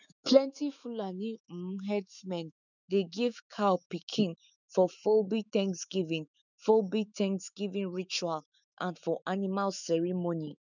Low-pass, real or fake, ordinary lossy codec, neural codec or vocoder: 7.2 kHz; fake; none; autoencoder, 48 kHz, 128 numbers a frame, DAC-VAE, trained on Japanese speech